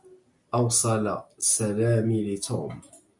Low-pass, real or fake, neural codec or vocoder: 10.8 kHz; real; none